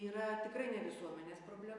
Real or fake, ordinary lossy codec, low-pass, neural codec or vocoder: real; AAC, 64 kbps; 10.8 kHz; none